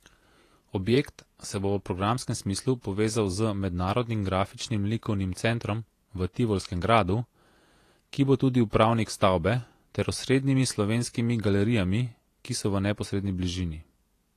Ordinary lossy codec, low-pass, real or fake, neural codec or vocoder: AAC, 48 kbps; 14.4 kHz; fake; vocoder, 48 kHz, 128 mel bands, Vocos